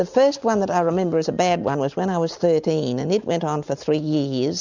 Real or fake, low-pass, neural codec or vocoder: real; 7.2 kHz; none